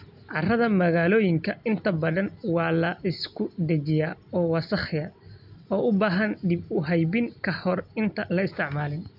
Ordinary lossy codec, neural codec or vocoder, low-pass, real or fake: none; none; 5.4 kHz; real